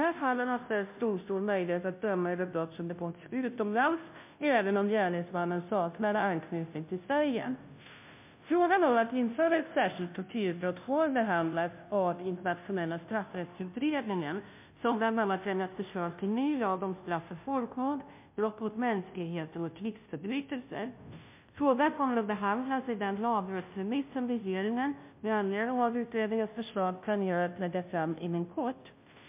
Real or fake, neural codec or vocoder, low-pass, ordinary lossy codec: fake; codec, 16 kHz, 0.5 kbps, FunCodec, trained on Chinese and English, 25 frames a second; 3.6 kHz; MP3, 32 kbps